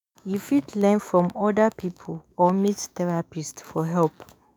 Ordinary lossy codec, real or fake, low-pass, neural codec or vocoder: none; fake; none; autoencoder, 48 kHz, 128 numbers a frame, DAC-VAE, trained on Japanese speech